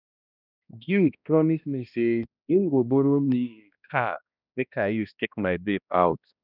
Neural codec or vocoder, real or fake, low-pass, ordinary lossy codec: codec, 16 kHz, 1 kbps, X-Codec, HuBERT features, trained on balanced general audio; fake; 5.4 kHz; none